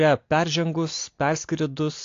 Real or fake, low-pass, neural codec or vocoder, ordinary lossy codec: real; 7.2 kHz; none; AAC, 48 kbps